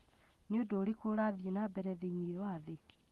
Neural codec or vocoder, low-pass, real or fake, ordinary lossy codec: none; 14.4 kHz; real; Opus, 24 kbps